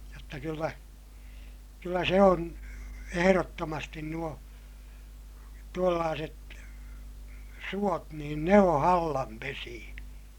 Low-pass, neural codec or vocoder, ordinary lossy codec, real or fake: 19.8 kHz; none; none; real